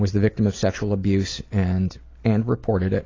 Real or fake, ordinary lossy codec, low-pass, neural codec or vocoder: real; AAC, 32 kbps; 7.2 kHz; none